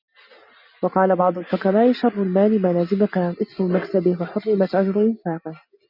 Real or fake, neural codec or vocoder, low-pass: real; none; 5.4 kHz